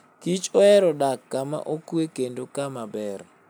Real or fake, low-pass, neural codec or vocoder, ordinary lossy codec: real; none; none; none